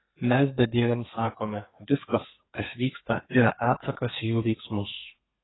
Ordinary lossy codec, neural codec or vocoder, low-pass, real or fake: AAC, 16 kbps; codec, 32 kHz, 1.9 kbps, SNAC; 7.2 kHz; fake